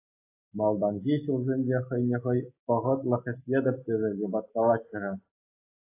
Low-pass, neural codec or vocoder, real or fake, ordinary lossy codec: 3.6 kHz; none; real; AAC, 24 kbps